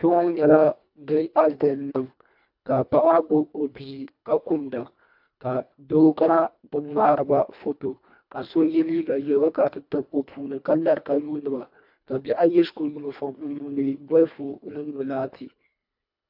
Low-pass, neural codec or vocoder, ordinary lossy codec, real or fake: 5.4 kHz; codec, 24 kHz, 1.5 kbps, HILCodec; AAC, 48 kbps; fake